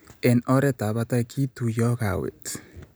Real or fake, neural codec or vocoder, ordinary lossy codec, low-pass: real; none; none; none